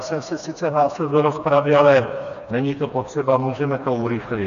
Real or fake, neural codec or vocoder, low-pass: fake; codec, 16 kHz, 2 kbps, FreqCodec, smaller model; 7.2 kHz